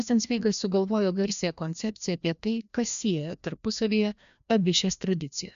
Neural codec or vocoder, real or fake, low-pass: codec, 16 kHz, 1 kbps, FreqCodec, larger model; fake; 7.2 kHz